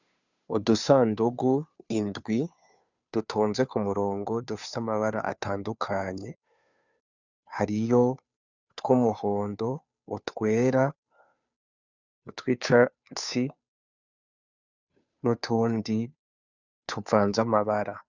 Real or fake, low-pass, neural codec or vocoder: fake; 7.2 kHz; codec, 16 kHz, 2 kbps, FunCodec, trained on Chinese and English, 25 frames a second